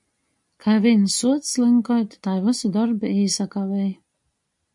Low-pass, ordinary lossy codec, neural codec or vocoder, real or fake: 10.8 kHz; MP3, 64 kbps; none; real